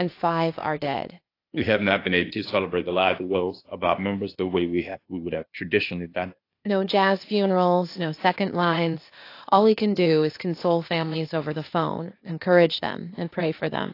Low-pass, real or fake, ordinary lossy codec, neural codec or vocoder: 5.4 kHz; fake; AAC, 32 kbps; codec, 16 kHz, 0.8 kbps, ZipCodec